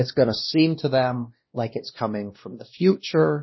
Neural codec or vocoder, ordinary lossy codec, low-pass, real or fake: codec, 16 kHz, 1 kbps, X-Codec, WavLM features, trained on Multilingual LibriSpeech; MP3, 24 kbps; 7.2 kHz; fake